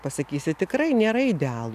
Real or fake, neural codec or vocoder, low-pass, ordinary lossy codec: real; none; 14.4 kHz; AAC, 96 kbps